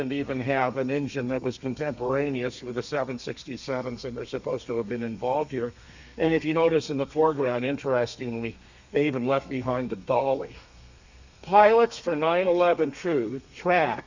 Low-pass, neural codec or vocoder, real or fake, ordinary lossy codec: 7.2 kHz; codec, 32 kHz, 1.9 kbps, SNAC; fake; Opus, 64 kbps